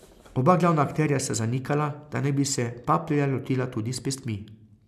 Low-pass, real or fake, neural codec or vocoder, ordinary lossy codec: 14.4 kHz; real; none; MP3, 96 kbps